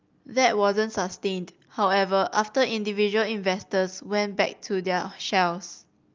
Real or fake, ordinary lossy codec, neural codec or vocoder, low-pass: real; Opus, 24 kbps; none; 7.2 kHz